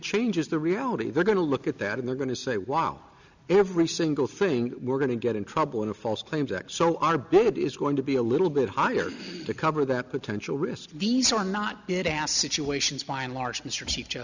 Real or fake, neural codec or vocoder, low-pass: real; none; 7.2 kHz